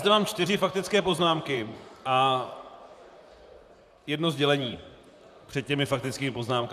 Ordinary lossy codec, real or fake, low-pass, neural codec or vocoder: MP3, 96 kbps; fake; 14.4 kHz; vocoder, 44.1 kHz, 128 mel bands, Pupu-Vocoder